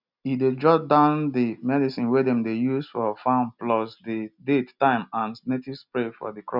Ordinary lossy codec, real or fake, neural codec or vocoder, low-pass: none; real; none; 5.4 kHz